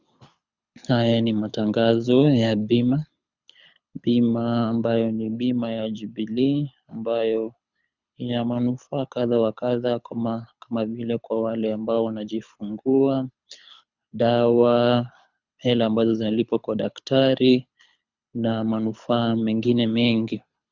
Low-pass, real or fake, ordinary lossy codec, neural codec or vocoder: 7.2 kHz; fake; Opus, 64 kbps; codec, 24 kHz, 6 kbps, HILCodec